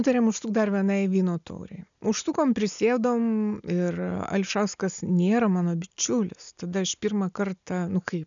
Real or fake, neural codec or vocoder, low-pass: real; none; 7.2 kHz